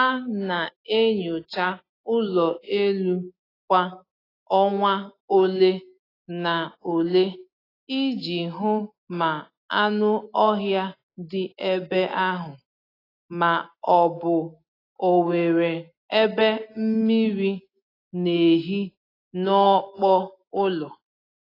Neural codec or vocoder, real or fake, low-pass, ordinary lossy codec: none; real; 5.4 kHz; AAC, 24 kbps